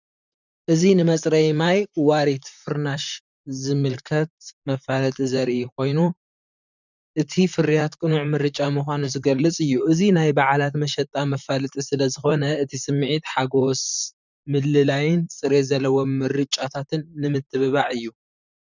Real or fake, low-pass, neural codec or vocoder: fake; 7.2 kHz; vocoder, 44.1 kHz, 128 mel bands, Pupu-Vocoder